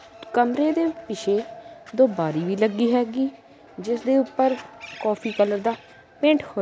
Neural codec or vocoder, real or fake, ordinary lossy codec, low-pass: none; real; none; none